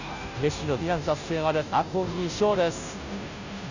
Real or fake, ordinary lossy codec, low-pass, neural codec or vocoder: fake; none; 7.2 kHz; codec, 16 kHz, 0.5 kbps, FunCodec, trained on Chinese and English, 25 frames a second